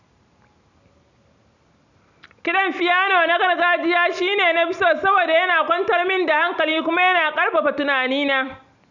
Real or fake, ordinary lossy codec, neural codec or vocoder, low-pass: real; none; none; 7.2 kHz